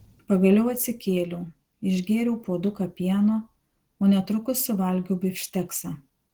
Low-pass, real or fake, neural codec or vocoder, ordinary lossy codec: 19.8 kHz; real; none; Opus, 16 kbps